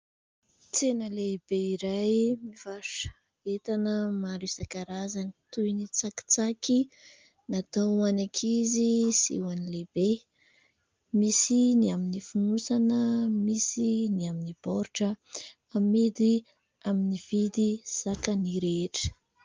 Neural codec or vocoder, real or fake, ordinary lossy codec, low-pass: none; real; Opus, 32 kbps; 7.2 kHz